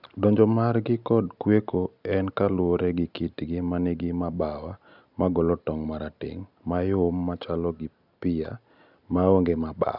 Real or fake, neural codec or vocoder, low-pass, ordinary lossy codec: real; none; 5.4 kHz; none